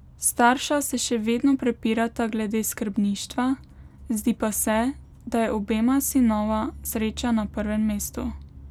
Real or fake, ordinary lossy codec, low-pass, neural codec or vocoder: real; none; 19.8 kHz; none